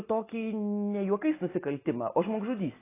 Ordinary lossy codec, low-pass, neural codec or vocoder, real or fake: AAC, 16 kbps; 3.6 kHz; none; real